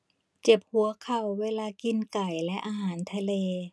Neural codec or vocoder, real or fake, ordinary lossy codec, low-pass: none; real; none; none